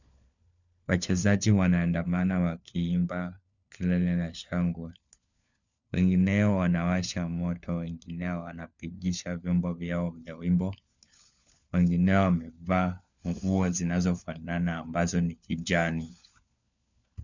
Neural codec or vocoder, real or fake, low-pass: codec, 16 kHz, 4 kbps, FunCodec, trained on LibriTTS, 50 frames a second; fake; 7.2 kHz